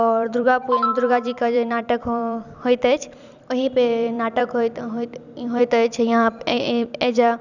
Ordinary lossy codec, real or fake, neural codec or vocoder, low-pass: none; fake; vocoder, 44.1 kHz, 128 mel bands every 256 samples, BigVGAN v2; 7.2 kHz